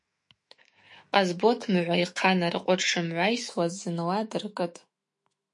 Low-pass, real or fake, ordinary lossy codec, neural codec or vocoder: 10.8 kHz; fake; MP3, 48 kbps; autoencoder, 48 kHz, 128 numbers a frame, DAC-VAE, trained on Japanese speech